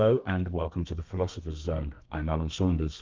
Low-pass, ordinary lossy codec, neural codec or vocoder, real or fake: 7.2 kHz; Opus, 24 kbps; codec, 32 kHz, 1.9 kbps, SNAC; fake